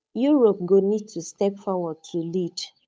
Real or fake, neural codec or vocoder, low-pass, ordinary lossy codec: fake; codec, 16 kHz, 8 kbps, FunCodec, trained on Chinese and English, 25 frames a second; none; none